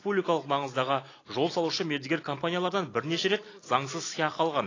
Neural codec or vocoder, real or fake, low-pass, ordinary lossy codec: none; real; 7.2 kHz; AAC, 32 kbps